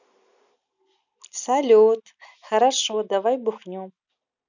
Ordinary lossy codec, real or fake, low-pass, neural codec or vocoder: none; real; 7.2 kHz; none